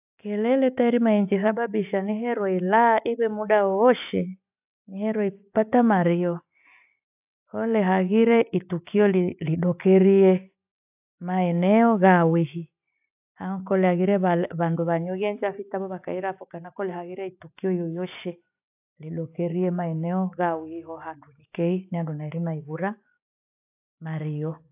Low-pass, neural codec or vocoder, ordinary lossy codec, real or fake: 3.6 kHz; none; AAC, 32 kbps; real